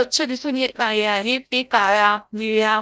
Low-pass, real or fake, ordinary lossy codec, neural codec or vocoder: none; fake; none; codec, 16 kHz, 0.5 kbps, FreqCodec, larger model